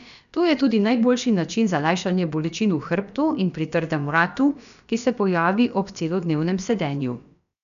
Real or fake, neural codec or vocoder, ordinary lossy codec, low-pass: fake; codec, 16 kHz, about 1 kbps, DyCAST, with the encoder's durations; none; 7.2 kHz